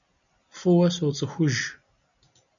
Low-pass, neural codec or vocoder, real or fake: 7.2 kHz; none; real